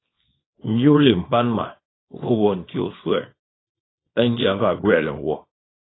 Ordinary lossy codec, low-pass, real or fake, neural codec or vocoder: AAC, 16 kbps; 7.2 kHz; fake; codec, 24 kHz, 0.9 kbps, WavTokenizer, small release